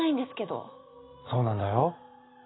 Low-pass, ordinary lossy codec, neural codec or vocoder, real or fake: 7.2 kHz; AAC, 16 kbps; none; real